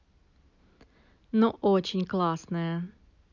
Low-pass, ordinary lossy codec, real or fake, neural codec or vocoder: 7.2 kHz; none; real; none